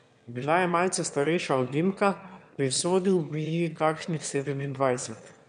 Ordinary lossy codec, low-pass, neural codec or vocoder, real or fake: none; 9.9 kHz; autoencoder, 22.05 kHz, a latent of 192 numbers a frame, VITS, trained on one speaker; fake